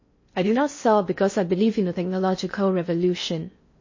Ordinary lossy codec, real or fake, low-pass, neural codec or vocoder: MP3, 32 kbps; fake; 7.2 kHz; codec, 16 kHz in and 24 kHz out, 0.6 kbps, FocalCodec, streaming, 4096 codes